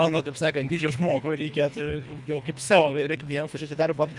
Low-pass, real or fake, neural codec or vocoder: 10.8 kHz; fake; codec, 24 kHz, 1.5 kbps, HILCodec